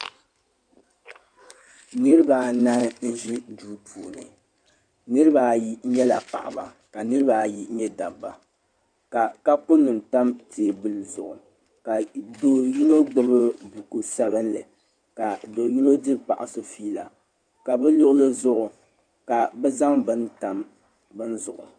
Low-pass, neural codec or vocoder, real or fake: 9.9 kHz; codec, 16 kHz in and 24 kHz out, 2.2 kbps, FireRedTTS-2 codec; fake